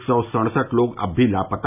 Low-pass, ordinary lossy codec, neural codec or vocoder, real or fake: 3.6 kHz; none; none; real